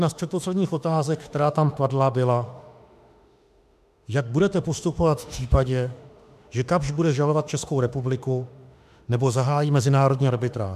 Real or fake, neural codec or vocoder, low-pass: fake; autoencoder, 48 kHz, 32 numbers a frame, DAC-VAE, trained on Japanese speech; 14.4 kHz